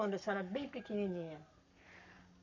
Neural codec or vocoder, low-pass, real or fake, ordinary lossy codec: codec, 44.1 kHz, 7.8 kbps, Pupu-Codec; 7.2 kHz; fake; none